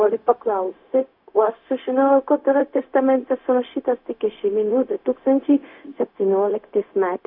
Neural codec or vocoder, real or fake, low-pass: codec, 16 kHz, 0.4 kbps, LongCat-Audio-Codec; fake; 5.4 kHz